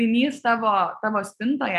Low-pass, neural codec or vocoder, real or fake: 14.4 kHz; none; real